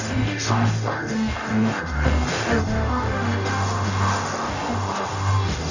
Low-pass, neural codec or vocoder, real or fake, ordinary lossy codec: 7.2 kHz; codec, 44.1 kHz, 0.9 kbps, DAC; fake; none